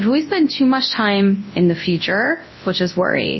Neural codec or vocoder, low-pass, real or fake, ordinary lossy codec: codec, 24 kHz, 0.9 kbps, WavTokenizer, large speech release; 7.2 kHz; fake; MP3, 24 kbps